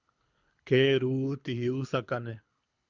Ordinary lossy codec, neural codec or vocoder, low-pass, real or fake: Opus, 64 kbps; codec, 24 kHz, 6 kbps, HILCodec; 7.2 kHz; fake